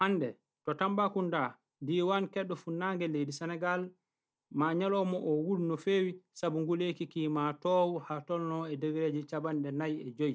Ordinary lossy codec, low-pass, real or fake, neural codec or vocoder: none; none; real; none